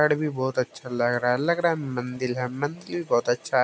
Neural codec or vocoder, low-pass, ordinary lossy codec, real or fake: none; none; none; real